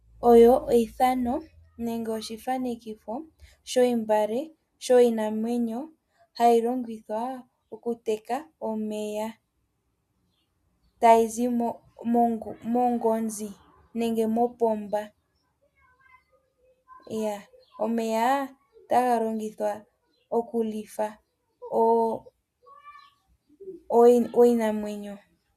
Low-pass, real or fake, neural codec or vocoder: 14.4 kHz; real; none